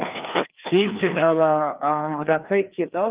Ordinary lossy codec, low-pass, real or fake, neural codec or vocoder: Opus, 32 kbps; 3.6 kHz; fake; codec, 16 kHz, 2 kbps, FreqCodec, larger model